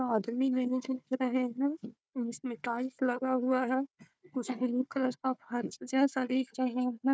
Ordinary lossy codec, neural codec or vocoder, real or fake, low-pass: none; codec, 16 kHz, 4 kbps, FunCodec, trained on Chinese and English, 50 frames a second; fake; none